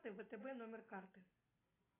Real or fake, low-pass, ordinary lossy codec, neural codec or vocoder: real; 3.6 kHz; AAC, 16 kbps; none